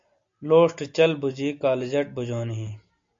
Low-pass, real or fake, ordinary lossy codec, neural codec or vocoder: 7.2 kHz; real; MP3, 48 kbps; none